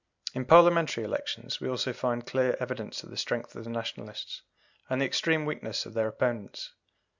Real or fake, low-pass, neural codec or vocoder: real; 7.2 kHz; none